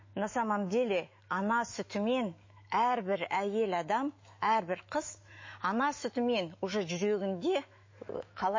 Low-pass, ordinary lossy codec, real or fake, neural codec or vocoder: 7.2 kHz; MP3, 32 kbps; fake; autoencoder, 48 kHz, 128 numbers a frame, DAC-VAE, trained on Japanese speech